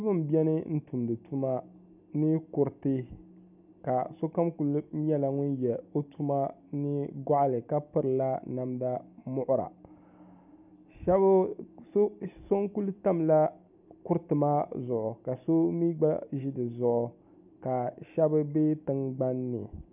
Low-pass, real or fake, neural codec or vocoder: 3.6 kHz; real; none